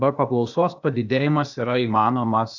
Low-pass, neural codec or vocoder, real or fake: 7.2 kHz; codec, 16 kHz, 0.8 kbps, ZipCodec; fake